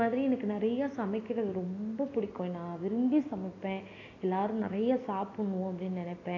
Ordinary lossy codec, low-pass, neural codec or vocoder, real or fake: AAC, 32 kbps; 7.2 kHz; none; real